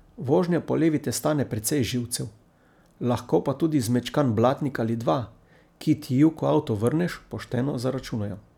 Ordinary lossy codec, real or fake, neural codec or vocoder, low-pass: none; real; none; 19.8 kHz